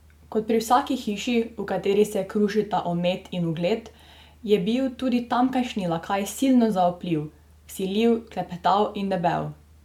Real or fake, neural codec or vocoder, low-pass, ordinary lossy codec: real; none; 19.8 kHz; MP3, 96 kbps